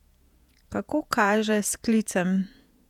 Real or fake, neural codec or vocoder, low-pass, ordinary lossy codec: fake; vocoder, 48 kHz, 128 mel bands, Vocos; 19.8 kHz; none